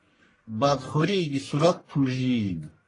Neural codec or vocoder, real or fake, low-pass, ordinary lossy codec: codec, 44.1 kHz, 1.7 kbps, Pupu-Codec; fake; 10.8 kHz; AAC, 32 kbps